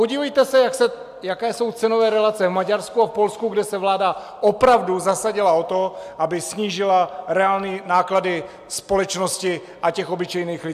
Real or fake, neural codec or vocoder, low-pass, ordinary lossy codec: real; none; 14.4 kHz; AAC, 96 kbps